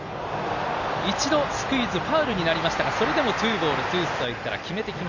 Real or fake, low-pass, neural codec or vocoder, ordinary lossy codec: real; 7.2 kHz; none; none